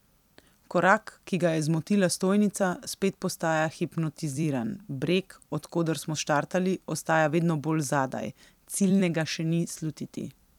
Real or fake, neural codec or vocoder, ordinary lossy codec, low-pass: fake; vocoder, 44.1 kHz, 128 mel bands every 256 samples, BigVGAN v2; none; 19.8 kHz